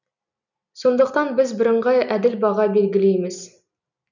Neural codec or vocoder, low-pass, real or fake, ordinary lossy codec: none; 7.2 kHz; real; none